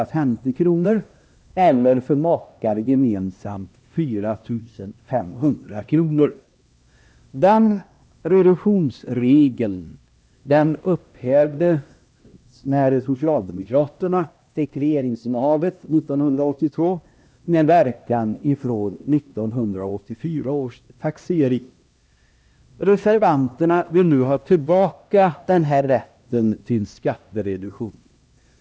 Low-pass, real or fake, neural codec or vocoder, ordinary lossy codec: none; fake; codec, 16 kHz, 1 kbps, X-Codec, HuBERT features, trained on LibriSpeech; none